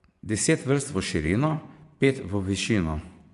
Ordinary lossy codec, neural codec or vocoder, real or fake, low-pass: MP3, 96 kbps; vocoder, 24 kHz, 100 mel bands, Vocos; fake; 10.8 kHz